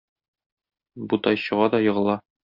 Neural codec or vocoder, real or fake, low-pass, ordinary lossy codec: none; real; 5.4 kHz; Opus, 64 kbps